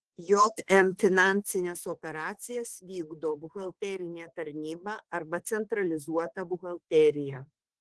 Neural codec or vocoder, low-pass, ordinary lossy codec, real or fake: autoencoder, 48 kHz, 32 numbers a frame, DAC-VAE, trained on Japanese speech; 10.8 kHz; Opus, 16 kbps; fake